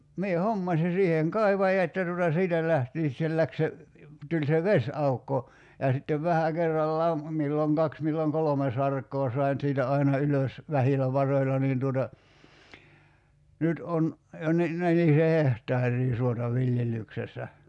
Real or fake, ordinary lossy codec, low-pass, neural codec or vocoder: real; none; 10.8 kHz; none